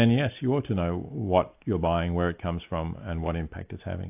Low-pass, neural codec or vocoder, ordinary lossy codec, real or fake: 3.6 kHz; none; AAC, 32 kbps; real